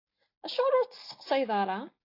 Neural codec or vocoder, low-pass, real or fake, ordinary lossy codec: none; 5.4 kHz; real; AAC, 32 kbps